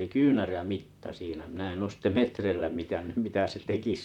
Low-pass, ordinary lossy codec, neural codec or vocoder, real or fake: 19.8 kHz; none; vocoder, 44.1 kHz, 128 mel bands, Pupu-Vocoder; fake